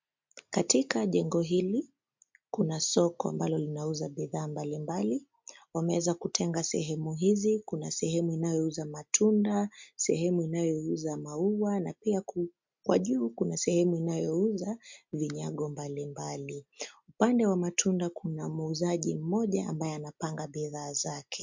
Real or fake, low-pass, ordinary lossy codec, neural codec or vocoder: real; 7.2 kHz; MP3, 64 kbps; none